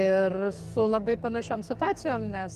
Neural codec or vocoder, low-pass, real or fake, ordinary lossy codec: codec, 44.1 kHz, 2.6 kbps, SNAC; 14.4 kHz; fake; Opus, 24 kbps